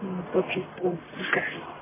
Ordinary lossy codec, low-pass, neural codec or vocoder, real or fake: MP3, 16 kbps; 3.6 kHz; codec, 44.1 kHz, 1.7 kbps, Pupu-Codec; fake